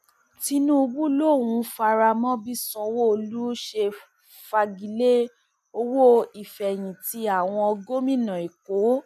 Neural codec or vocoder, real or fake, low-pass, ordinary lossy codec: none; real; 14.4 kHz; none